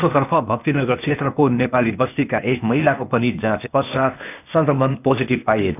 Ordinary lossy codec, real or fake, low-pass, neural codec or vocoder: none; fake; 3.6 kHz; codec, 16 kHz, 0.8 kbps, ZipCodec